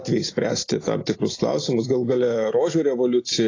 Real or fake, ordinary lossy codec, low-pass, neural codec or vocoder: real; AAC, 32 kbps; 7.2 kHz; none